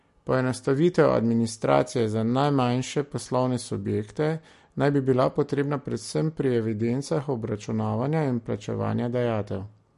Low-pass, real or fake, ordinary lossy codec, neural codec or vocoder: 14.4 kHz; real; MP3, 48 kbps; none